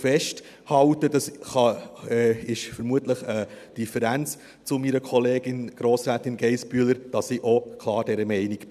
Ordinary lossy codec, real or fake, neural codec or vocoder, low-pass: none; real; none; 14.4 kHz